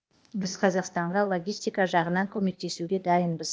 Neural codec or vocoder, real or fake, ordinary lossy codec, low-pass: codec, 16 kHz, 0.8 kbps, ZipCodec; fake; none; none